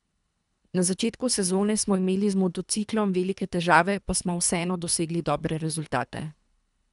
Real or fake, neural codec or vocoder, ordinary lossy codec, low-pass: fake; codec, 24 kHz, 3 kbps, HILCodec; none; 10.8 kHz